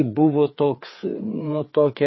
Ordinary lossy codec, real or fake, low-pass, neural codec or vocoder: MP3, 24 kbps; fake; 7.2 kHz; codec, 16 kHz, 4 kbps, FreqCodec, larger model